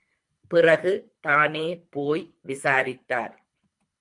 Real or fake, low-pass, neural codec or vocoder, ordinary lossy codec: fake; 10.8 kHz; codec, 24 kHz, 3 kbps, HILCodec; MP3, 64 kbps